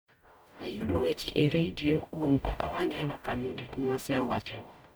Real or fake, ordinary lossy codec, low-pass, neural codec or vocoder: fake; none; none; codec, 44.1 kHz, 0.9 kbps, DAC